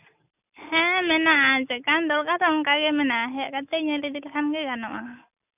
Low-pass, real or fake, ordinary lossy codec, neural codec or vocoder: 3.6 kHz; real; none; none